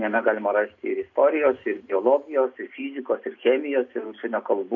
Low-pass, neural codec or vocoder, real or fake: 7.2 kHz; none; real